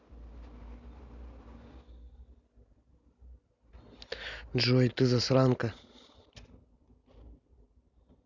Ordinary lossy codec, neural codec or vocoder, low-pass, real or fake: none; none; 7.2 kHz; real